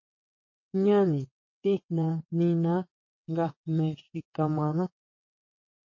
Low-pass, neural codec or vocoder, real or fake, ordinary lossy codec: 7.2 kHz; vocoder, 22.05 kHz, 80 mel bands, WaveNeXt; fake; MP3, 32 kbps